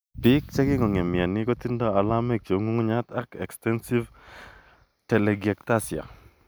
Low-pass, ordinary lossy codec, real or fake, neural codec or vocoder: none; none; real; none